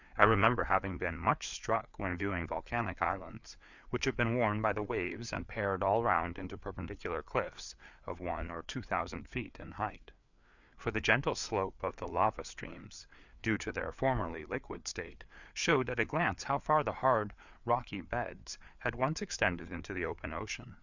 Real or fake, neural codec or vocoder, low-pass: fake; codec, 16 kHz, 4 kbps, FreqCodec, larger model; 7.2 kHz